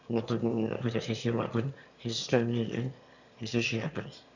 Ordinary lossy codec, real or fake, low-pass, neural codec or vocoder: none; fake; 7.2 kHz; autoencoder, 22.05 kHz, a latent of 192 numbers a frame, VITS, trained on one speaker